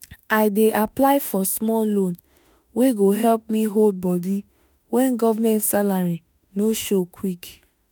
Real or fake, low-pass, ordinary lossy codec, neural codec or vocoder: fake; none; none; autoencoder, 48 kHz, 32 numbers a frame, DAC-VAE, trained on Japanese speech